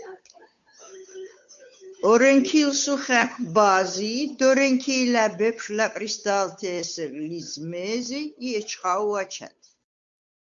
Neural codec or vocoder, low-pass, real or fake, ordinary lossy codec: codec, 16 kHz, 8 kbps, FunCodec, trained on Chinese and English, 25 frames a second; 7.2 kHz; fake; MP3, 64 kbps